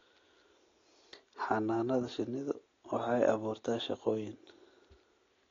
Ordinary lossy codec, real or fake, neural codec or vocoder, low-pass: AAC, 32 kbps; real; none; 7.2 kHz